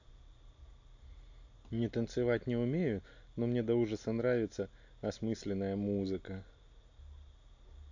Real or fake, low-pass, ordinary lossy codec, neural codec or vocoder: real; 7.2 kHz; AAC, 48 kbps; none